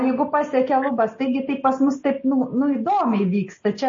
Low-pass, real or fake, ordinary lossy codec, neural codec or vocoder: 7.2 kHz; real; MP3, 32 kbps; none